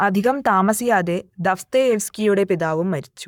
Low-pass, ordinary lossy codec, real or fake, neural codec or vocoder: 19.8 kHz; none; fake; codec, 44.1 kHz, 7.8 kbps, Pupu-Codec